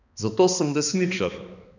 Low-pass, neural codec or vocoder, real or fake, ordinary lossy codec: 7.2 kHz; codec, 16 kHz, 2 kbps, X-Codec, HuBERT features, trained on balanced general audio; fake; none